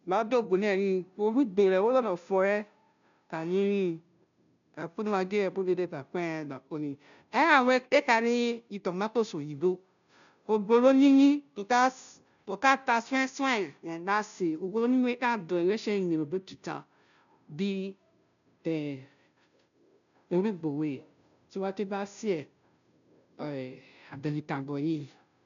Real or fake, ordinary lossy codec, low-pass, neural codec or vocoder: fake; none; 7.2 kHz; codec, 16 kHz, 0.5 kbps, FunCodec, trained on Chinese and English, 25 frames a second